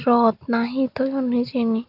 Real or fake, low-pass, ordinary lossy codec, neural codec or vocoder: real; 5.4 kHz; none; none